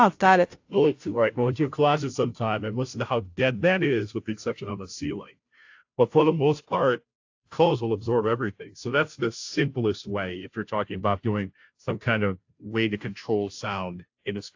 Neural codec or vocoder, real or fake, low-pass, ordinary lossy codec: codec, 16 kHz, 0.5 kbps, FunCodec, trained on Chinese and English, 25 frames a second; fake; 7.2 kHz; AAC, 48 kbps